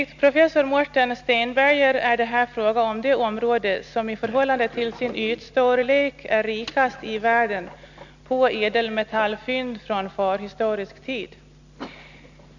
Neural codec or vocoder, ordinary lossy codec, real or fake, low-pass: none; none; real; 7.2 kHz